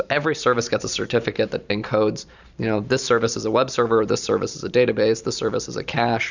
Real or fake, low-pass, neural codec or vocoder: fake; 7.2 kHz; vocoder, 44.1 kHz, 128 mel bands every 512 samples, BigVGAN v2